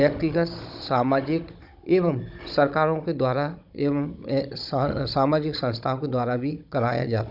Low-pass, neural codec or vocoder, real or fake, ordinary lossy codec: 5.4 kHz; codec, 16 kHz, 16 kbps, FunCodec, trained on Chinese and English, 50 frames a second; fake; none